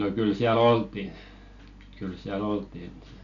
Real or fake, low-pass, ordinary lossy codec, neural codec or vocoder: real; 7.2 kHz; none; none